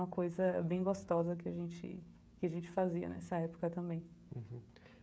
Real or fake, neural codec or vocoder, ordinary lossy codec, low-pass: fake; codec, 16 kHz, 16 kbps, FreqCodec, smaller model; none; none